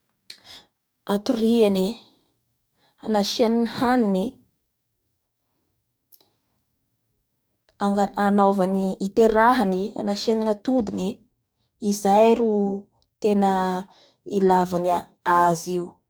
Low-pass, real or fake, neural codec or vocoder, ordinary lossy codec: none; fake; codec, 44.1 kHz, 2.6 kbps, DAC; none